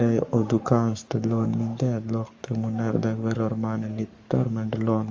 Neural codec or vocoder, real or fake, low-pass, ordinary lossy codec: codec, 44.1 kHz, 7.8 kbps, Pupu-Codec; fake; 7.2 kHz; Opus, 32 kbps